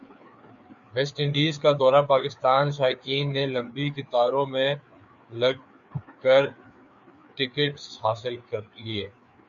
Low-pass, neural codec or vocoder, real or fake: 7.2 kHz; codec, 16 kHz, 4 kbps, FreqCodec, larger model; fake